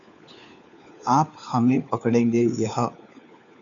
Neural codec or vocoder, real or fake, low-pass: codec, 16 kHz, 4 kbps, FunCodec, trained on LibriTTS, 50 frames a second; fake; 7.2 kHz